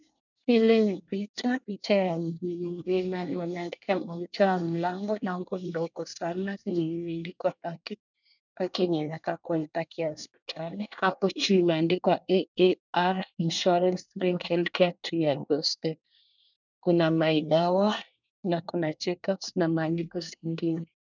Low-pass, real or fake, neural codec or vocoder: 7.2 kHz; fake; codec, 24 kHz, 1 kbps, SNAC